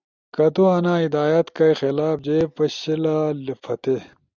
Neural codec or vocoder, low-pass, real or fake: none; 7.2 kHz; real